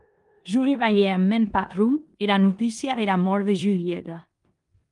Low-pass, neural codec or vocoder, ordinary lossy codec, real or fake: 10.8 kHz; codec, 16 kHz in and 24 kHz out, 0.9 kbps, LongCat-Audio-Codec, four codebook decoder; Opus, 32 kbps; fake